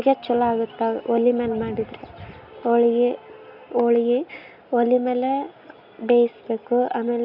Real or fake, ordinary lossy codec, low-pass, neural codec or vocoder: real; none; 5.4 kHz; none